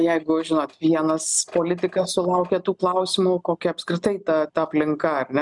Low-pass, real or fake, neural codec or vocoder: 10.8 kHz; real; none